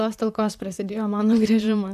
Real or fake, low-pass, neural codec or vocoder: fake; 14.4 kHz; vocoder, 44.1 kHz, 128 mel bands, Pupu-Vocoder